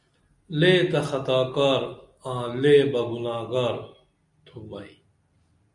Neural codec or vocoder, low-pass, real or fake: none; 10.8 kHz; real